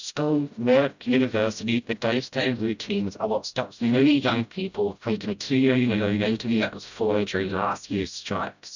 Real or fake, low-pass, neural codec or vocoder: fake; 7.2 kHz; codec, 16 kHz, 0.5 kbps, FreqCodec, smaller model